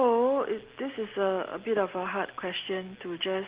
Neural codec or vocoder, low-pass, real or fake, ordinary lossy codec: none; 3.6 kHz; real; Opus, 16 kbps